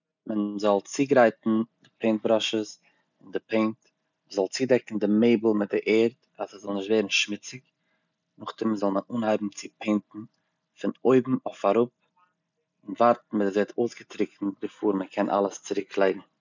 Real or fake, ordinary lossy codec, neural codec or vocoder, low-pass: real; none; none; 7.2 kHz